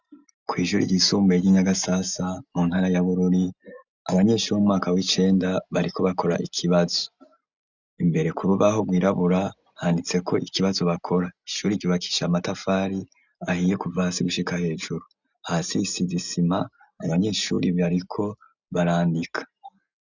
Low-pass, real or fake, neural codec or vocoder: 7.2 kHz; real; none